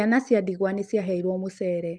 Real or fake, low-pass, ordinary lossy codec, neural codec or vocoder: real; 9.9 kHz; Opus, 24 kbps; none